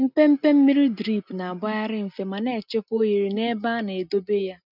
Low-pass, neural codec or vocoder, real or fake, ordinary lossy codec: 5.4 kHz; none; real; none